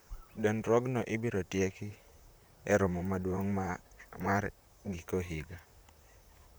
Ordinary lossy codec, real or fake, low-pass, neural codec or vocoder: none; fake; none; vocoder, 44.1 kHz, 128 mel bands, Pupu-Vocoder